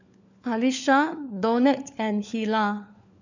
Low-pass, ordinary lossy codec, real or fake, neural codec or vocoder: 7.2 kHz; none; fake; codec, 16 kHz, 4 kbps, FunCodec, trained on LibriTTS, 50 frames a second